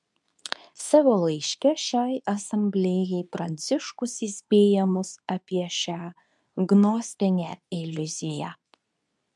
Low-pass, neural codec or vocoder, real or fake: 10.8 kHz; codec, 24 kHz, 0.9 kbps, WavTokenizer, medium speech release version 2; fake